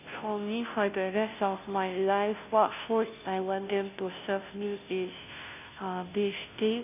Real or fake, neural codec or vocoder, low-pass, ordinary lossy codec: fake; codec, 16 kHz, 0.5 kbps, FunCodec, trained on Chinese and English, 25 frames a second; 3.6 kHz; none